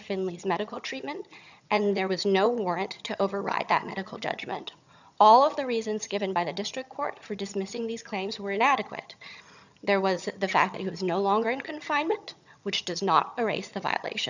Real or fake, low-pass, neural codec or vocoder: fake; 7.2 kHz; vocoder, 22.05 kHz, 80 mel bands, HiFi-GAN